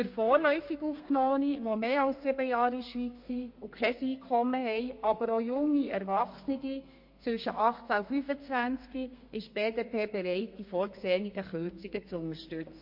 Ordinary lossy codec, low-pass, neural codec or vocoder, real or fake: MP3, 32 kbps; 5.4 kHz; codec, 32 kHz, 1.9 kbps, SNAC; fake